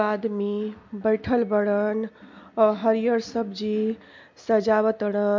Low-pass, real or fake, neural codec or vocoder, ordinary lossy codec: 7.2 kHz; real; none; MP3, 48 kbps